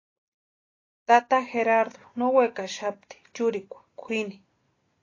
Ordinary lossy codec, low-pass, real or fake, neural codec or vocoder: AAC, 32 kbps; 7.2 kHz; real; none